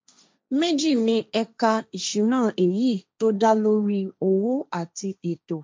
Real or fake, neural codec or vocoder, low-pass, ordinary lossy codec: fake; codec, 16 kHz, 1.1 kbps, Voila-Tokenizer; none; none